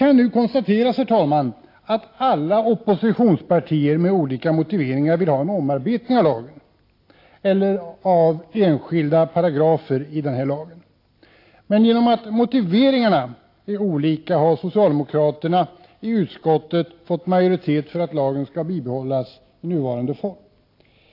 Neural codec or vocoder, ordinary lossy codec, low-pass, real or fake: none; AAC, 32 kbps; 5.4 kHz; real